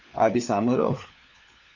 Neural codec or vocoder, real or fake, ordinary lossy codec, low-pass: codec, 16 kHz, 4 kbps, FunCodec, trained on LibriTTS, 50 frames a second; fake; AAC, 48 kbps; 7.2 kHz